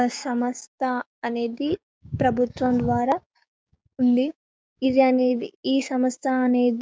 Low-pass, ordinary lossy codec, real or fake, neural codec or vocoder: none; none; fake; codec, 16 kHz, 6 kbps, DAC